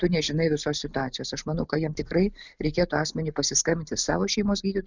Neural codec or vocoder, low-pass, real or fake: none; 7.2 kHz; real